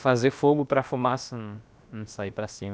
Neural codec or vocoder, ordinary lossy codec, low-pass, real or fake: codec, 16 kHz, about 1 kbps, DyCAST, with the encoder's durations; none; none; fake